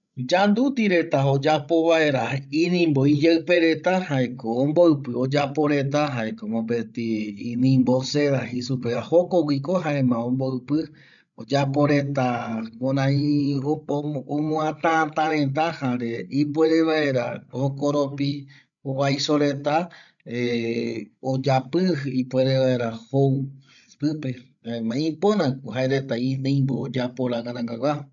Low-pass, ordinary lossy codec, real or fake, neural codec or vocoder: 7.2 kHz; none; fake; codec, 16 kHz, 16 kbps, FreqCodec, larger model